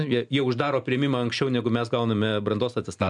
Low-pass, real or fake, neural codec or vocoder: 10.8 kHz; real; none